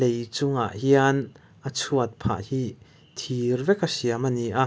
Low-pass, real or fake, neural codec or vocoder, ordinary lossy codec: none; real; none; none